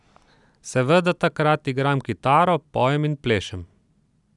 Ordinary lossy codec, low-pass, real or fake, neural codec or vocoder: none; 10.8 kHz; real; none